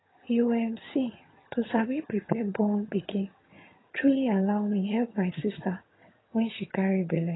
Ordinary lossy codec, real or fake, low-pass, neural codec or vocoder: AAC, 16 kbps; fake; 7.2 kHz; vocoder, 22.05 kHz, 80 mel bands, HiFi-GAN